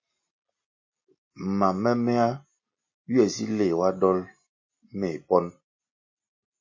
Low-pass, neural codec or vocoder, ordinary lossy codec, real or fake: 7.2 kHz; none; MP3, 32 kbps; real